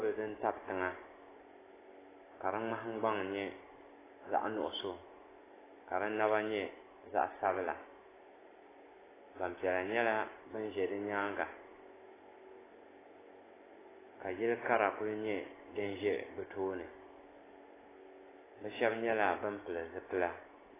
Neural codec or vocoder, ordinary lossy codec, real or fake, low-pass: none; AAC, 16 kbps; real; 3.6 kHz